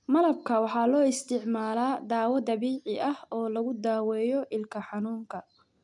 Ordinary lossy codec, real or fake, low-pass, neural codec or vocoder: none; real; 10.8 kHz; none